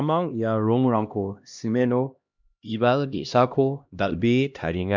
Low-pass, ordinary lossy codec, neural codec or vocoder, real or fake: 7.2 kHz; MP3, 64 kbps; codec, 16 kHz, 1 kbps, X-Codec, HuBERT features, trained on LibriSpeech; fake